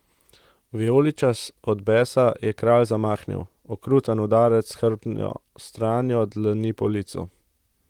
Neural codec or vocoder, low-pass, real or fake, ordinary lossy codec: vocoder, 44.1 kHz, 128 mel bands, Pupu-Vocoder; 19.8 kHz; fake; Opus, 24 kbps